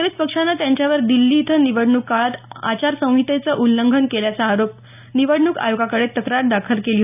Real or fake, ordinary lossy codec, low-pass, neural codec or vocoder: real; none; 3.6 kHz; none